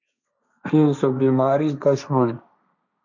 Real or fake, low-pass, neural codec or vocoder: fake; 7.2 kHz; codec, 16 kHz, 1.1 kbps, Voila-Tokenizer